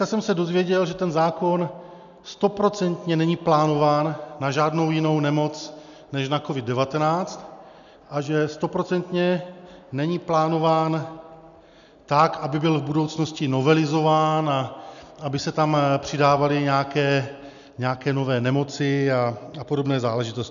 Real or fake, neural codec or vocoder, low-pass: real; none; 7.2 kHz